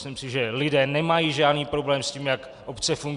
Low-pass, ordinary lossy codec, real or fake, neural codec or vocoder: 10.8 kHz; Opus, 64 kbps; real; none